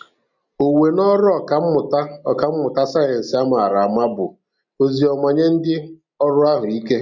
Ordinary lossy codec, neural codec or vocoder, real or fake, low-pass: none; none; real; 7.2 kHz